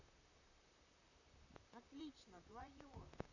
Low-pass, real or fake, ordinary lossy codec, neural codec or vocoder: 7.2 kHz; fake; none; vocoder, 44.1 kHz, 128 mel bands, Pupu-Vocoder